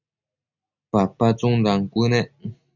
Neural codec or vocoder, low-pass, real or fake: none; 7.2 kHz; real